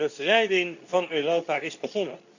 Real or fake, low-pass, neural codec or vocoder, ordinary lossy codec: fake; 7.2 kHz; codec, 24 kHz, 0.9 kbps, WavTokenizer, medium speech release version 2; none